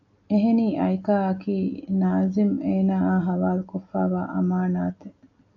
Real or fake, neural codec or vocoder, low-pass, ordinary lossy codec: real; none; 7.2 kHz; AAC, 48 kbps